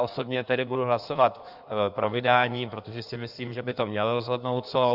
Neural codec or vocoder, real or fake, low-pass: codec, 16 kHz in and 24 kHz out, 1.1 kbps, FireRedTTS-2 codec; fake; 5.4 kHz